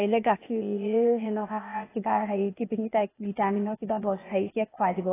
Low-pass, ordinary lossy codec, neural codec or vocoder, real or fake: 3.6 kHz; AAC, 16 kbps; codec, 16 kHz, 0.8 kbps, ZipCodec; fake